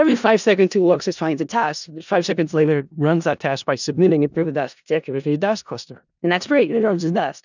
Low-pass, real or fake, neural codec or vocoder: 7.2 kHz; fake; codec, 16 kHz in and 24 kHz out, 0.4 kbps, LongCat-Audio-Codec, four codebook decoder